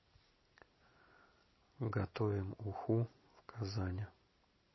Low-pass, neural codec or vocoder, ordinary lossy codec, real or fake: 7.2 kHz; none; MP3, 24 kbps; real